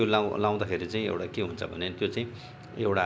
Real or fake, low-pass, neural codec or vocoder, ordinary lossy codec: real; none; none; none